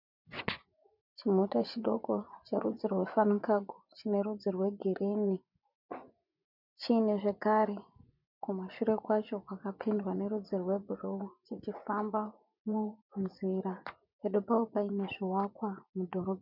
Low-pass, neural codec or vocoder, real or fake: 5.4 kHz; vocoder, 24 kHz, 100 mel bands, Vocos; fake